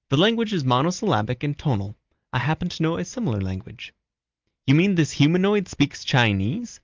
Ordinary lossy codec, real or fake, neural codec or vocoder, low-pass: Opus, 32 kbps; real; none; 7.2 kHz